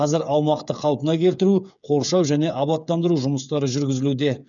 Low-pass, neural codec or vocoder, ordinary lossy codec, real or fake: 7.2 kHz; codec, 16 kHz, 8 kbps, FreqCodec, smaller model; none; fake